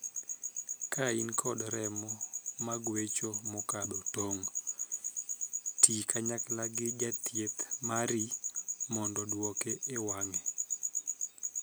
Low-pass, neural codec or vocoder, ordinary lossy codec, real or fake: none; none; none; real